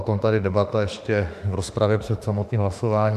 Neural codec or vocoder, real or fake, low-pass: autoencoder, 48 kHz, 32 numbers a frame, DAC-VAE, trained on Japanese speech; fake; 14.4 kHz